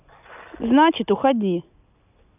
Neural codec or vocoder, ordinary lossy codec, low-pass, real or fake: none; none; 3.6 kHz; real